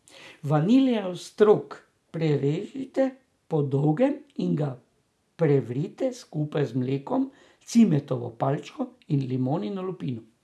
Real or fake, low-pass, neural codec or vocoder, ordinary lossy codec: real; none; none; none